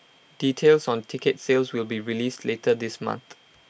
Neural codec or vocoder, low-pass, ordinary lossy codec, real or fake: none; none; none; real